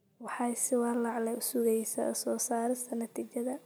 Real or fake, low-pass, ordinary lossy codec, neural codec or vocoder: real; none; none; none